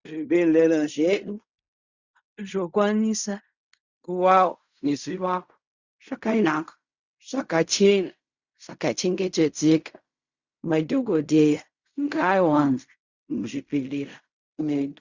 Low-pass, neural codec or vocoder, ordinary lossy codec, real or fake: 7.2 kHz; codec, 16 kHz in and 24 kHz out, 0.4 kbps, LongCat-Audio-Codec, fine tuned four codebook decoder; Opus, 64 kbps; fake